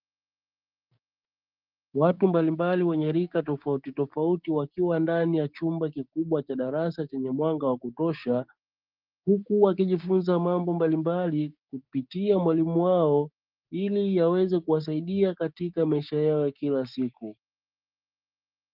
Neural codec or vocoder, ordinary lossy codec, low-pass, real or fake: codec, 16 kHz, 6 kbps, DAC; Opus, 32 kbps; 5.4 kHz; fake